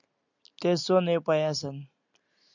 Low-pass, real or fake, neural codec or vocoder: 7.2 kHz; real; none